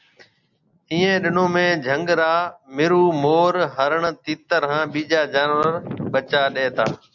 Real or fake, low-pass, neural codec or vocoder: real; 7.2 kHz; none